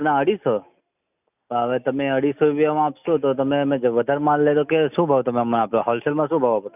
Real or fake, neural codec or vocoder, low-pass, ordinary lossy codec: real; none; 3.6 kHz; none